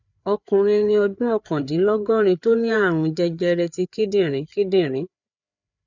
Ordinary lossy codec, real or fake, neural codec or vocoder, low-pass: none; fake; codec, 16 kHz, 4 kbps, FreqCodec, larger model; 7.2 kHz